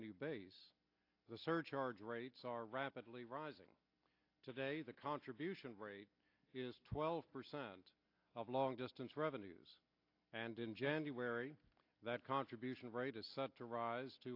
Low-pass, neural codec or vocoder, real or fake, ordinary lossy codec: 5.4 kHz; none; real; AAC, 32 kbps